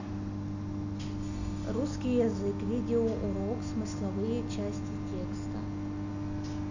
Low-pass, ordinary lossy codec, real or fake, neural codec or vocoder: 7.2 kHz; none; real; none